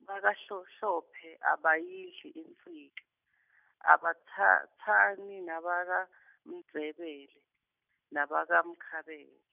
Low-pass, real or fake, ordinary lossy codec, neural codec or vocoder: 3.6 kHz; real; none; none